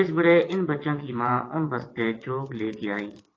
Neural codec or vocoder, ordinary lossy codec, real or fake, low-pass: vocoder, 22.05 kHz, 80 mel bands, WaveNeXt; AAC, 32 kbps; fake; 7.2 kHz